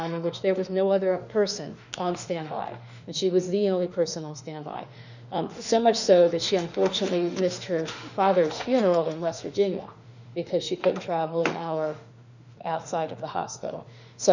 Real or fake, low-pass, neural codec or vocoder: fake; 7.2 kHz; autoencoder, 48 kHz, 32 numbers a frame, DAC-VAE, trained on Japanese speech